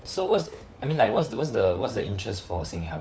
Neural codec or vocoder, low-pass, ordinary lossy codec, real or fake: codec, 16 kHz, 4 kbps, FunCodec, trained on LibriTTS, 50 frames a second; none; none; fake